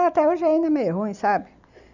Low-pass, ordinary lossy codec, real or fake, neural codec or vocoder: 7.2 kHz; none; real; none